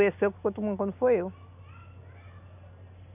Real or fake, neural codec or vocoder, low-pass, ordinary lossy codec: real; none; 3.6 kHz; none